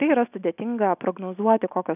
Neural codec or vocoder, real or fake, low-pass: none; real; 3.6 kHz